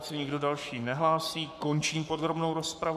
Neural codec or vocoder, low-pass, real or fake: codec, 44.1 kHz, 7.8 kbps, Pupu-Codec; 14.4 kHz; fake